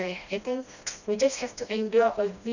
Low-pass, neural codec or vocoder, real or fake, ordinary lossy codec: 7.2 kHz; codec, 16 kHz, 1 kbps, FreqCodec, smaller model; fake; none